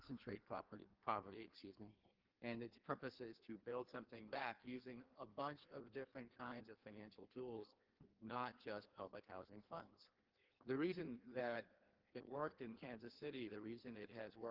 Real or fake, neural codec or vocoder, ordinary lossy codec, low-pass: fake; codec, 16 kHz in and 24 kHz out, 1.1 kbps, FireRedTTS-2 codec; Opus, 16 kbps; 5.4 kHz